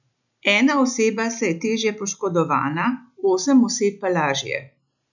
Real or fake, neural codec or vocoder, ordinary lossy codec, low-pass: real; none; none; 7.2 kHz